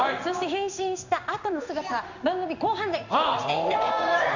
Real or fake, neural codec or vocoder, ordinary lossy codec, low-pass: fake; codec, 16 kHz in and 24 kHz out, 1 kbps, XY-Tokenizer; none; 7.2 kHz